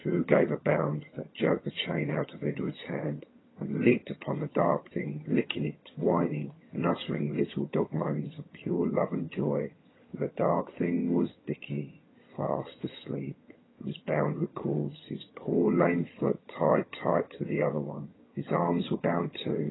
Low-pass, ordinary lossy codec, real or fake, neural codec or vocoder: 7.2 kHz; AAC, 16 kbps; fake; vocoder, 22.05 kHz, 80 mel bands, HiFi-GAN